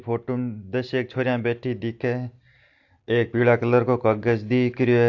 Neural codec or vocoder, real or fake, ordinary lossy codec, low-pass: none; real; AAC, 48 kbps; 7.2 kHz